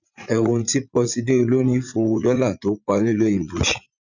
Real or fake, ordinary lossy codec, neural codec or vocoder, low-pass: fake; none; vocoder, 44.1 kHz, 80 mel bands, Vocos; 7.2 kHz